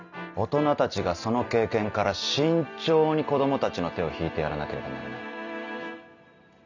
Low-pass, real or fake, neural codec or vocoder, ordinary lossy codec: 7.2 kHz; real; none; none